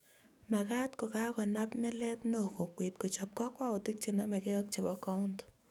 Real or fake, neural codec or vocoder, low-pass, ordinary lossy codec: fake; codec, 44.1 kHz, 7.8 kbps, DAC; 19.8 kHz; none